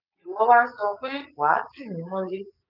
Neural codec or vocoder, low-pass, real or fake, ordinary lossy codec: vocoder, 22.05 kHz, 80 mel bands, Vocos; 5.4 kHz; fake; none